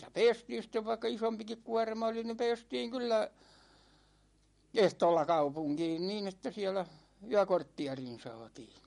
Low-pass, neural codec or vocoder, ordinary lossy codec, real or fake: 19.8 kHz; none; MP3, 48 kbps; real